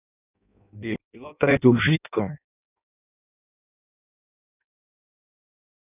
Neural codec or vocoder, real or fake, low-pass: codec, 16 kHz in and 24 kHz out, 0.6 kbps, FireRedTTS-2 codec; fake; 3.6 kHz